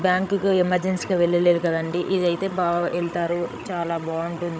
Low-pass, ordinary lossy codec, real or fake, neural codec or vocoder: none; none; fake; codec, 16 kHz, 16 kbps, FreqCodec, larger model